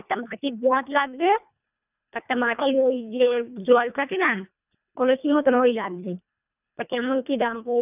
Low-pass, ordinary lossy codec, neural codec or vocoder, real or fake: 3.6 kHz; none; codec, 24 kHz, 1.5 kbps, HILCodec; fake